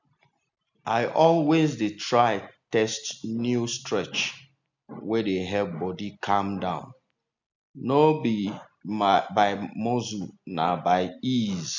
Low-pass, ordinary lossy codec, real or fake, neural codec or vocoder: 7.2 kHz; none; real; none